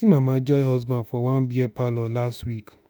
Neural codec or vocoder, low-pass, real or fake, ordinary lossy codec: autoencoder, 48 kHz, 32 numbers a frame, DAC-VAE, trained on Japanese speech; none; fake; none